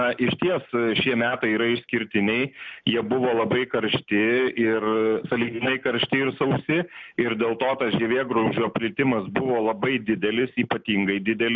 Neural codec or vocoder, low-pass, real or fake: none; 7.2 kHz; real